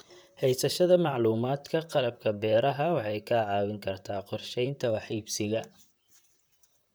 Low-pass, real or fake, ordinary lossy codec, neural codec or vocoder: none; fake; none; vocoder, 44.1 kHz, 128 mel bands, Pupu-Vocoder